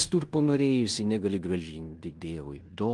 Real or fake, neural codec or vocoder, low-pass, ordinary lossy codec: fake; codec, 16 kHz in and 24 kHz out, 0.9 kbps, LongCat-Audio-Codec, fine tuned four codebook decoder; 10.8 kHz; Opus, 24 kbps